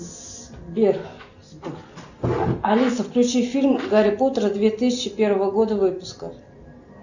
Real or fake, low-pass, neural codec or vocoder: real; 7.2 kHz; none